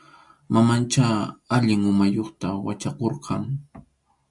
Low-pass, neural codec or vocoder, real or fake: 10.8 kHz; none; real